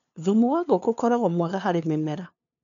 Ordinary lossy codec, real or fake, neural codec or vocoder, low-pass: none; fake; codec, 16 kHz, 2 kbps, FunCodec, trained on LibriTTS, 25 frames a second; 7.2 kHz